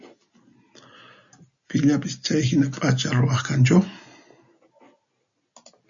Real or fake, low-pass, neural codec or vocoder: real; 7.2 kHz; none